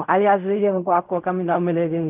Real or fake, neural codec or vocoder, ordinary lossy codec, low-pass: fake; codec, 16 kHz in and 24 kHz out, 0.4 kbps, LongCat-Audio-Codec, fine tuned four codebook decoder; none; 3.6 kHz